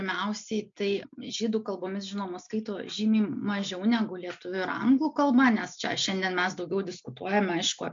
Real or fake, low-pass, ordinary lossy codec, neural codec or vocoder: real; 7.2 kHz; MP3, 48 kbps; none